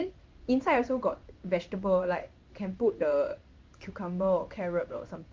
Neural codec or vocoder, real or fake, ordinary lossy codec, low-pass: codec, 16 kHz in and 24 kHz out, 1 kbps, XY-Tokenizer; fake; Opus, 24 kbps; 7.2 kHz